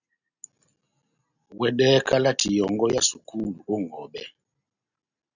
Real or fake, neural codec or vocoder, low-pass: real; none; 7.2 kHz